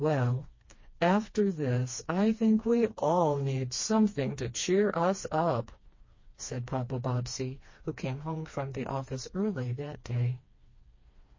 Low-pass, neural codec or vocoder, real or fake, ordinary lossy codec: 7.2 kHz; codec, 16 kHz, 2 kbps, FreqCodec, smaller model; fake; MP3, 32 kbps